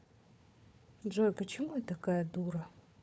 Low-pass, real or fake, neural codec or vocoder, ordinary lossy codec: none; fake; codec, 16 kHz, 4 kbps, FunCodec, trained on Chinese and English, 50 frames a second; none